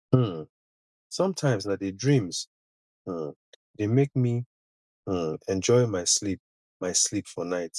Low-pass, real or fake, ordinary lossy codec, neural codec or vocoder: none; real; none; none